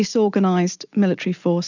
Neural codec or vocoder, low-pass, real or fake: none; 7.2 kHz; real